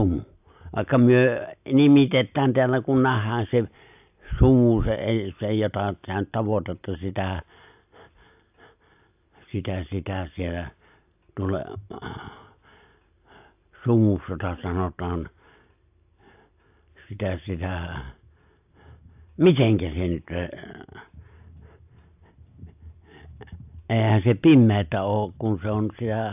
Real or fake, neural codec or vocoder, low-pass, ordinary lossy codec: real; none; 3.6 kHz; AAC, 32 kbps